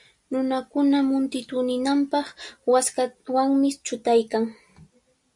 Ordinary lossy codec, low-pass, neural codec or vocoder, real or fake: MP3, 64 kbps; 10.8 kHz; none; real